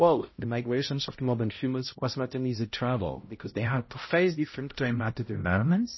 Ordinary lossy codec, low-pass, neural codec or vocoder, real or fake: MP3, 24 kbps; 7.2 kHz; codec, 16 kHz, 0.5 kbps, X-Codec, HuBERT features, trained on balanced general audio; fake